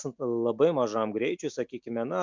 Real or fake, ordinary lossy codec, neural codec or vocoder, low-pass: real; MP3, 64 kbps; none; 7.2 kHz